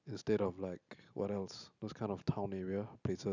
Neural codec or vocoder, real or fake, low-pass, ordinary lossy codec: none; real; 7.2 kHz; none